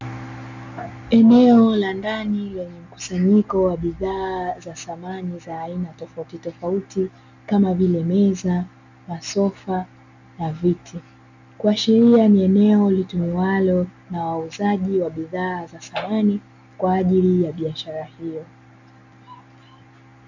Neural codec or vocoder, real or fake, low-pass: none; real; 7.2 kHz